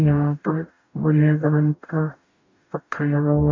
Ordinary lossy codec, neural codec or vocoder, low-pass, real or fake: MP3, 64 kbps; codec, 44.1 kHz, 0.9 kbps, DAC; 7.2 kHz; fake